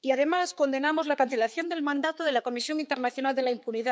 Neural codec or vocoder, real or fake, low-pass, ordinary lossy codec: codec, 16 kHz, 4 kbps, X-Codec, HuBERT features, trained on balanced general audio; fake; none; none